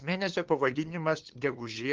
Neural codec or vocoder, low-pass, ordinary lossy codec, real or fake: codec, 16 kHz, 2 kbps, FunCodec, trained on LibriTTS, 25 frames a second; 7.2 kHz; Opus, 16 kbps; fake